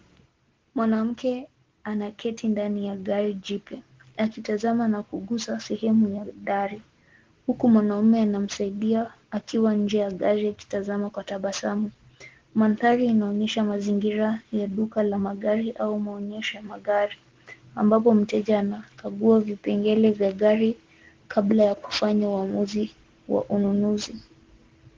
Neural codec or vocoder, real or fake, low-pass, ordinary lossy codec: none; real; 7.2 kHz; Opus, 16 kbps